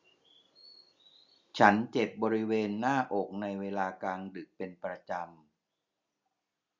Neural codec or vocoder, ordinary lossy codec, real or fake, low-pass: none; Opus, 64 kbps; real; 7.2 kHz